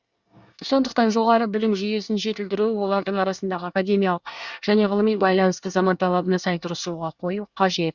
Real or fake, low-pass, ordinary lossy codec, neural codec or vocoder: fake; 7.2 kHz; Opus, 64 kbps; codec, 24 kHz, 1 kbps, SNAC